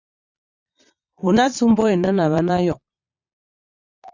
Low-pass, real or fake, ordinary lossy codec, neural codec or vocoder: 7.2 kHz; fake; Opus, 64 kbps; vocoder, 22.05 kHz, 80 mel bands, Vocos